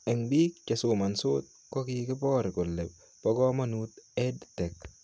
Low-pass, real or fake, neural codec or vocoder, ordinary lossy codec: none; real; none; none